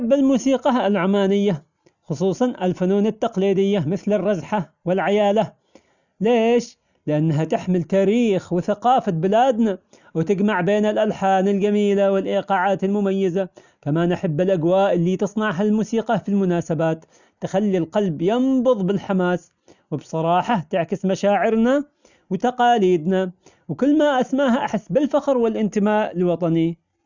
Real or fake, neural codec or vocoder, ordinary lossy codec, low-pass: real; none; none; 7.2 kHz